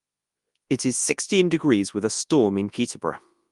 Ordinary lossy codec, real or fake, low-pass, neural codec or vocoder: Opus, 32 kbps; fake; 10.8 kHz; codec, 24 kHz, 0.9 kbps, WavTokenizer, large speech release